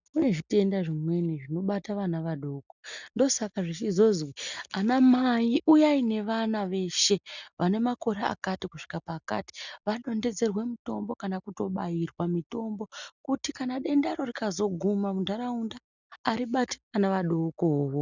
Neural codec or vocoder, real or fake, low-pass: none; real; 7.2 kHz